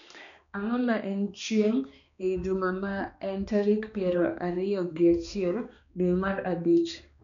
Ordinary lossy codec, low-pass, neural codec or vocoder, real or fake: none; 7.2 kHz; codec, 16 kHz, 2 kbps, X-Codec, HuBERT features, trained on balanced general audio; fake